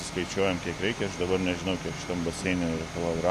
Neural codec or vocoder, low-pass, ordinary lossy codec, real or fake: none; 14.4 kHz; MP3, 64 kbps; real